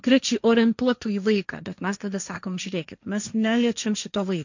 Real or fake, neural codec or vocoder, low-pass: fake; codec, 16 kHz, 1.1 kbps, Voila-Tokenizer; 7.2 kHz